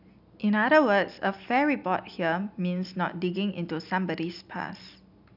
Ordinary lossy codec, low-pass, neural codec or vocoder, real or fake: none; 5.4 kHz; none; real